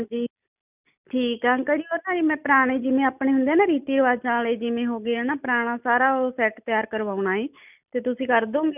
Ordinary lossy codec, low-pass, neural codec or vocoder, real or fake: none; 3.6 kHz; none; real